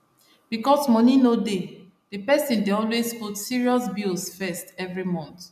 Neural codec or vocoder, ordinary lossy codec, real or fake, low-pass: none; none; real; 14.4 kHz